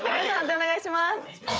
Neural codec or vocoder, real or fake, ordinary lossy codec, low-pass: codec, 16 kHz, 8 kbps, FreqCodec, larger model; fake; none; none